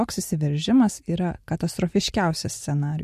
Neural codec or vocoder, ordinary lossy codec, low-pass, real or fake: none; MP3, 64 kbps; 14.4 kHz; real